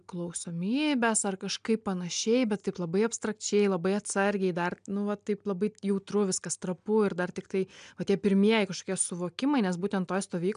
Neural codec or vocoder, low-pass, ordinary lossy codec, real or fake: none; 9.9 kHz; MP3, 96 kbps; real